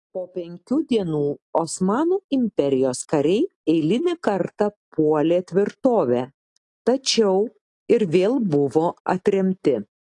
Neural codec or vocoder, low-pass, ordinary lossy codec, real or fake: none; 10.8 kHz; AAC, 48 kbps; real